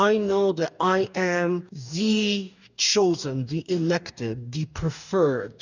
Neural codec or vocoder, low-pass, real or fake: codec, 44.1 kHz, 2.6 kbps, DAC; 7.2 kHz; fake